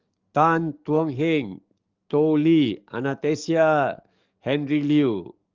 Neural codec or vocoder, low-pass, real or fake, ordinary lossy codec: codec, 44.1 kHz, 7.8 kbps, DAC; 7.2 kHz; fake; Opus, 32 kbps